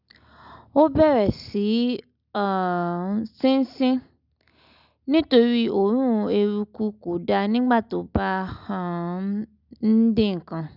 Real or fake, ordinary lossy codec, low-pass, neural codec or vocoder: real; none; 5.4 kHz; none